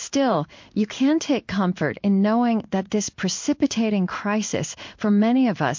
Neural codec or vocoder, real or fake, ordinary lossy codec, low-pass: codec, 16 kHz in and 24 kHz out, 1 kbps, XY-Tokenizer; fake; MP3, 48 kbps; 7.2 kHz